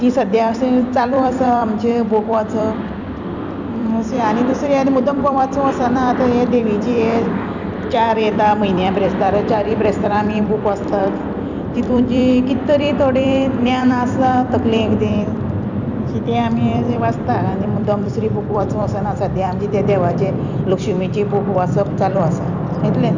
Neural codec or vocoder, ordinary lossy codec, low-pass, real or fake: vocoder, 44.1 kHz, 128 mel bands every 256 samples, BigVGAN v2; none; 7.2 kHz; fake